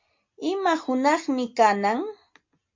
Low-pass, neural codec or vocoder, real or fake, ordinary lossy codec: 7.2 kHz; none; real; MP3, 48 kbps